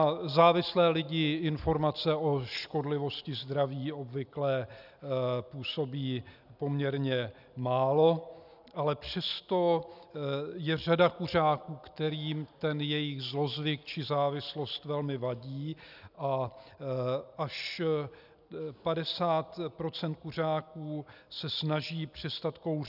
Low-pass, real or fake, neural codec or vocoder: 5.4 kHz; real; none